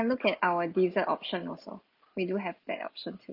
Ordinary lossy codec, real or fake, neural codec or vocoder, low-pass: Opus, 24 kbps; real; none; 5.4 kHz